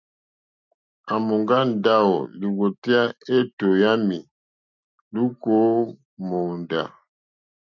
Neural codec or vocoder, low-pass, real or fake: none; 7.2 kHz; real